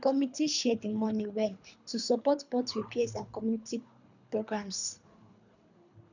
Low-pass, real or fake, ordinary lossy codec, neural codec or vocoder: 7.2 kHz; fake; none; codec, 24 kHz, 3 kbps, HILCodec